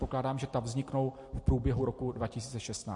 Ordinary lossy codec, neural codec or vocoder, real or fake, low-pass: MP3, 48 kbps; none; real; 10.8 kHz